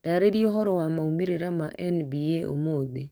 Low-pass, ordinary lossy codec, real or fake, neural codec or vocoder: none; none; fake; codec, 44.1 kHz, 7.8 kbps, DAC